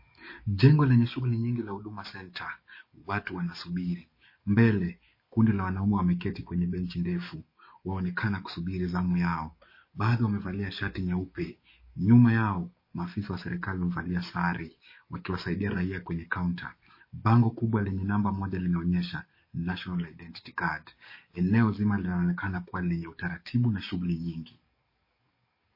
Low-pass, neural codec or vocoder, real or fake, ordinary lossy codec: 5.4 kHz; codec, 44.1 kHz, 7.8 kbps, DAC; fake; MP3, 24 kbps